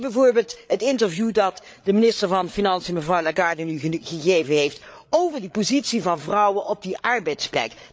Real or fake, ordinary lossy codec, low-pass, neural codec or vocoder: fake; none; none; codec, 16 kHz, 8 kbps, FreqCodec, larger model